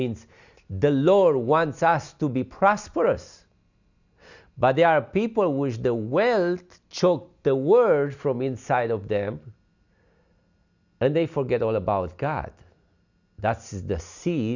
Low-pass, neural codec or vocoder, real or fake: 7.2 kHz; none; real